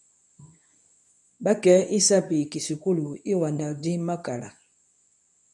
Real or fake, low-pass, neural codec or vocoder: fake; 10.8 kHz; codec, 24 kHz, 0.9 kbps, WavTokenizer, medium speech release version 2